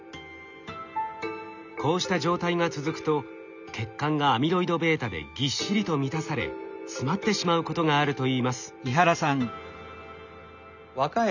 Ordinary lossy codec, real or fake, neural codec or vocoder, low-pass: none; real; none; 7.2 kHz